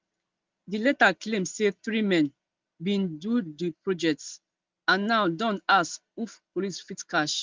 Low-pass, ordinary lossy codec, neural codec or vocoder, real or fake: 7.2 kHz; Opus, 24 kbps; none; real